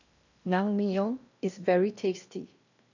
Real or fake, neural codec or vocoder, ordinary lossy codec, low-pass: fake; codec, 16 kHz in and 24 kHz out, 0.8 kbps, FocalCodec, streaming, 65536 codes; none; 7.2 kHz